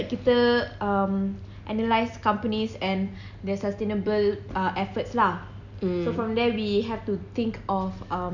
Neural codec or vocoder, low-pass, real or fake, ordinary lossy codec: none; 7.2 kHz; real; none